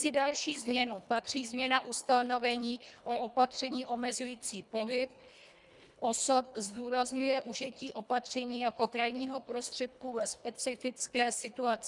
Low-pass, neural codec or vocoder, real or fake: 10.8 kHz; codec, 24 kHz, 1.5 kbps, HILCodec; fake